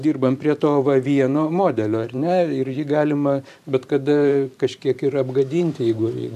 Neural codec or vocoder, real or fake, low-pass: vocoder, 44.1 kHz, 128 mel bands every 512 samples, BigVGAN v2; fake; 14.4 kHz